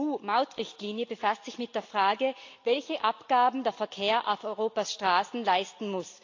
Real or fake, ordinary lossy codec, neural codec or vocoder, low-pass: real; AAC, 48 kbps; none; 7.2 kHz